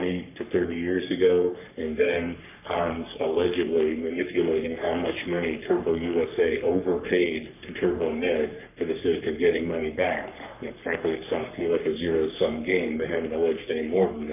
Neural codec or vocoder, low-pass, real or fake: codec, 44.1 kHz, 3.4 kbps, Pupu-Codec; 3.6 kHz; fake